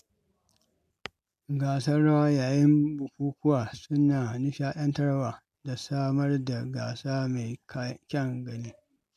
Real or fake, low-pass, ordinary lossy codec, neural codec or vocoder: real; 14.4 kHz; MP3, 96 kbps; none